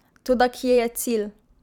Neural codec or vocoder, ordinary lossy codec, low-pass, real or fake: vocoder, 44.1 kHz, 128 mel bands every 512 samples, BigVGAN v2; none; 19.8 kHz; fake